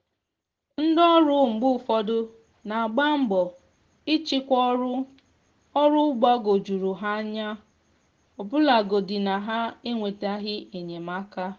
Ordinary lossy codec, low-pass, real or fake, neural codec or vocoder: Opus, 16 kbps; 7.2 kHz; real; none